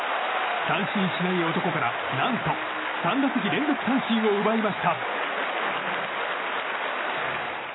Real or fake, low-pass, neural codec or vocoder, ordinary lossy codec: real; 7.2 kHz; none; AAC, 16 kbps